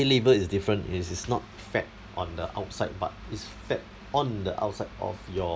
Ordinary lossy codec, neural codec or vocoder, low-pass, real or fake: none; none; none; real